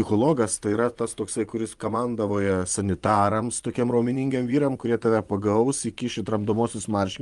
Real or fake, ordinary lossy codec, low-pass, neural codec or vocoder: fake; Opus, 32 kbps; 10.8 kHz; vocoder, 24 kHz, 100 mel bands, Vocos